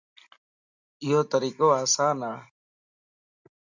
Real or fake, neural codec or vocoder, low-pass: real; none; 7.2 kHz